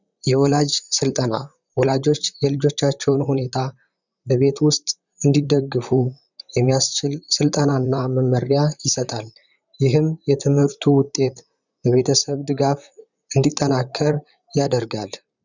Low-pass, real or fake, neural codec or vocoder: 7.2 kHz; fake; vocoder, 44.1 kHz, 128 mel bands, Pupu-Vocoder